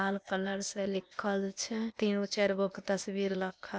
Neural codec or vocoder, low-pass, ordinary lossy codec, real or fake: codec, 16 kHz, 0.8 kbps, ZipCodec; none; none; fake